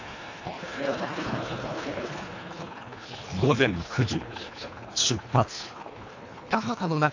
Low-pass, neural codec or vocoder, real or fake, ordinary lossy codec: 7.2 kHz; codec, 24 kHz, 1.5 kbps, HILCodec; fake; AAC, 48 kbps